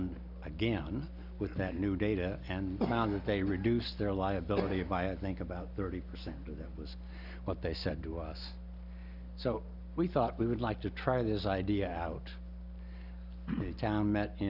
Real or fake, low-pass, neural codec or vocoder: real; 5.4 kHz; none